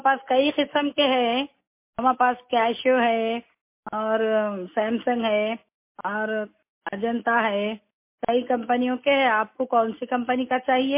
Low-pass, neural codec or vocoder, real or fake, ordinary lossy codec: 3.6 kHz; none; real; MP3, 24 kbps